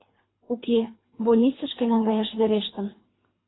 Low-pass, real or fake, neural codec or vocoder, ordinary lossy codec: 7.2 kHz; fake; codec, 24 kHz, 3 kbps, HILCodec; AAC, 16 kbps